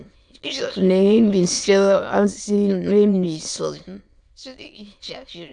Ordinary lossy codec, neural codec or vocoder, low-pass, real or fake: AAC, 64 kbps; autoencoder, 22.05 kHz, a latent of 192 numbers a frame, VITS, trained on many speakers; 9.9 kHz; fake